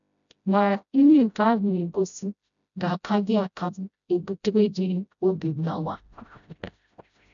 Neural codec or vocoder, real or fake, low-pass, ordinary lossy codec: codec, 16 kHz, 0.5 kbps, FreqCodec, smaller model; fake; 7.2 kHz; none